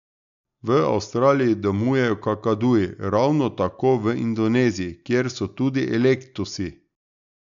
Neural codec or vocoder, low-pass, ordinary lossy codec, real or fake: none; 7.2 kHz; none; real